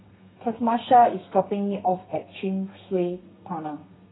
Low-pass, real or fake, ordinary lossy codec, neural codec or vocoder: 7.2 kHz; fake; AAC, 16 kbps; codec, 32 kHz, 1.9 kbps, SNAC